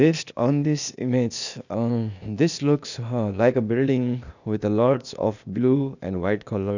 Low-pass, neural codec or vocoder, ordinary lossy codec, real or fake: 7.2 kHz; codec, 16 kHz, 0.8 kbps, ZipCodec; none; fake